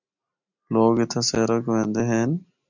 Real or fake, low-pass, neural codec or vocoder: real; 7.2 kHz; none